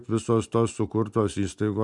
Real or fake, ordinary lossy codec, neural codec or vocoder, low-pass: real; MP3, 96 kbps; none; 10.8 kHz